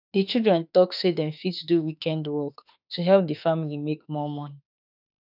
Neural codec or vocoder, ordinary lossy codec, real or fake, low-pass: autoencoder, 48 kHz, 32 numbers a frame, DAC-VAE, trained on Japanese speech; none; fake; 5.4 kHz